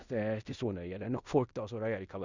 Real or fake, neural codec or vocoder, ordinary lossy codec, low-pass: fake; codec, 16 kHz in and 24 kHz out, 0.9 kbps, LongCat-Audio-Codec, fine tuned four codebook decoder; Opus, 64 kbps; 7.2 kHz